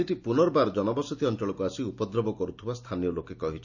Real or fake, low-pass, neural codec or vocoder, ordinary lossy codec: real; 7.2 kHz; none; none